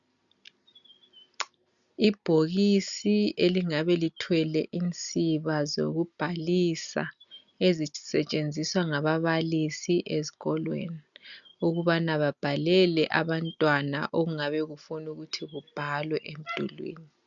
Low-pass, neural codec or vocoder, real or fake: 7.2 kHz; none; real